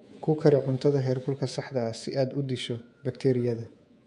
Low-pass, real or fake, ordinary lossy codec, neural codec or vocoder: 10.8 kHz; fake; MP3, 64 kbps; codec, 24 kHz, 3.1 kbps, DualCodec